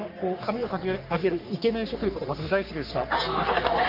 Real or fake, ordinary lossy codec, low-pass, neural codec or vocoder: fake; none; 5.4 kHz; codec, 44.1 kHz, 3.4 kbps, Pupu-Codec